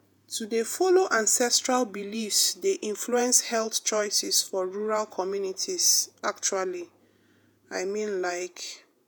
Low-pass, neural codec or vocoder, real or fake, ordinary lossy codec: none; vocoder, 48 kHz, 128 mel bands, Vocos; fake; none